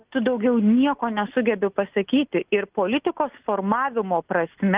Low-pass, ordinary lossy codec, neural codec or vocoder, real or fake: 3.6 kHz; Opus, 32 kbps; none; real